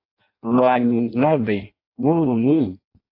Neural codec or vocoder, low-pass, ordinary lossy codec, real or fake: codec, 16 kHz in and 24 kHz out, 0.6 kbps, FireRedTTS-2 codec; 5.4 kHz; AAC, 32 kbps; fake